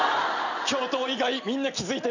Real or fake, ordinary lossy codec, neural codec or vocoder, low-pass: real; none; none; 7.2 kHz